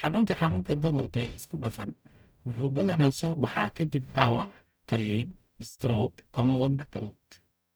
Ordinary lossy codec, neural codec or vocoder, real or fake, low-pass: none; codec, 44.1 kHz, 0.9 kbps, DAC; fake; none